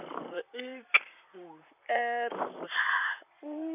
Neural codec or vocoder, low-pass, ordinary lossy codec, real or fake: vocoder, 44.1 kHz, 128 mel bands, Pupu-Vocoder; 3.6 kHz; none; fake